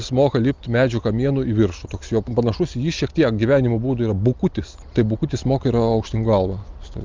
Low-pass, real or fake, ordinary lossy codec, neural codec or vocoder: 7.2 kHz; real; Opus, 24 kbps; none